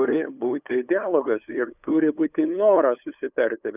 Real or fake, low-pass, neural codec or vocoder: fake; 3.6 kHz; codec, 16 kHz, 8 kbps, FunCodec, trained on LibriTTS, 25 frames a second